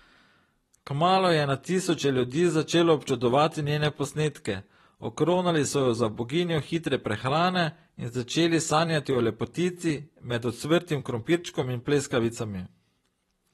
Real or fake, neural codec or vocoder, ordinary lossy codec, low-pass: fake; vocoder, 44.1 kHz, 128 mel bands every 256 samples, BigVGAN v2; AAC, 32 kbps; 19.8 kHz